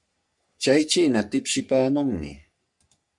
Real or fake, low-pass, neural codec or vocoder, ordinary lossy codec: fake; 10.8 kHz; codec, 44.1 kHz, 3.4 kbps, Pupu-Codec; MP3, 64 kbps